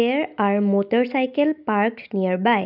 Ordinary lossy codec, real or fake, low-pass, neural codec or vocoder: none; real; 5.4 kHz; none